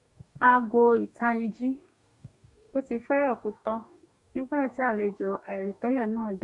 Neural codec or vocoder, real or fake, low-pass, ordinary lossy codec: codec, 44.1 kHz, 2.6 kbps, DAC; fake; 10.8 kHz; none